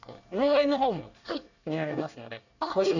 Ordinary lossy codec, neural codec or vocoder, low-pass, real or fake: none; codec, 24 kHz, 1 kbps, SNAC; 7.2 kHz; fake